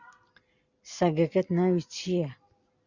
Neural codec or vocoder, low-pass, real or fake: none; 7.2 kHz; real